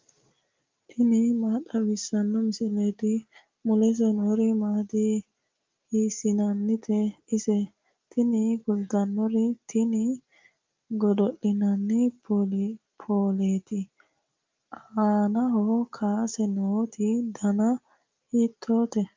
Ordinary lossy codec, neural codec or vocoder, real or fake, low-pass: Opus, 24 kbps; none; real; 7.2 kHz